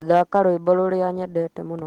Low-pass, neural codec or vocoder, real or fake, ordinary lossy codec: 19.8 kHz; none; real; Opus, 16 kbps